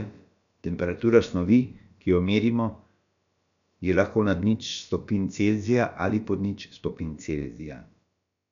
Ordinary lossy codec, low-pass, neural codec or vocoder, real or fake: none; 7.2 kHz; codec, 16 kHz, about 1 kbps, DyCAST, with the encoder's durations; fake